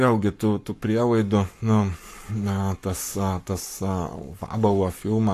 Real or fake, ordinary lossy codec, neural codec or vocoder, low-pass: fake; AAC, 64 kbps; codec, 44.1 kHz, 7.8 kbps, Pupu-Codec; 14.4 kHz